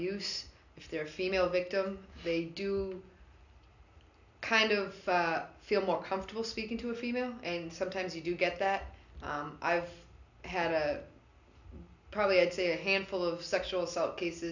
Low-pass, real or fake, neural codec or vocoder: 7.2 kHz; real; none